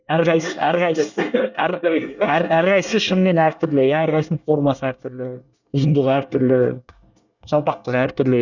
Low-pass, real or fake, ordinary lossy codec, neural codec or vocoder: 7.2 kHz; fake; none; codec, 24 kHz, 1 kbps, SNAC